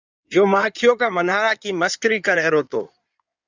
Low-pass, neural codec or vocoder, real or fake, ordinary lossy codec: 7.2 kHz; codec, 16 kHz in and 24 kHz out, 2.2 kbps, FireRedTTS-2 codec; fake; Opus, 64 kbps